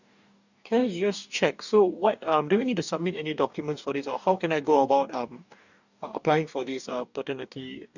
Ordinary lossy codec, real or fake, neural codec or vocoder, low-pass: none; fake; codec, 44.1 kHz, 2.6 kbps, DAC; 7.2 kHz